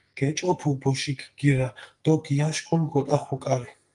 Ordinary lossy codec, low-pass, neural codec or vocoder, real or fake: Opus, 32 kbps; 10.8 kHz; codec, 44.1 kHz, 2.6 kbps, SNAC; fake